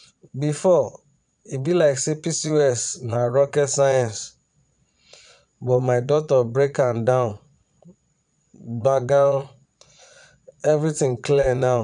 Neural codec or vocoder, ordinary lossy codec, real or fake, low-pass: vocoder, 22.05 kHz, 80 mel bands, Vocos; none; fake; 9.9 kHz